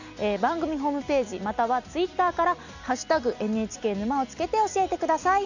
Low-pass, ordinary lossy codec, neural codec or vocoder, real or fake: 7.2 kHz; none; none; real